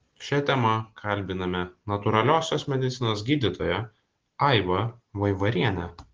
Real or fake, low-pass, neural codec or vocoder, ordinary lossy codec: real; 7.2 kHz; none; Opus, 16 kbps